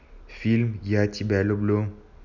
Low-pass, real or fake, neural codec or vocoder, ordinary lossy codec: 7.2 kHz; real; none; none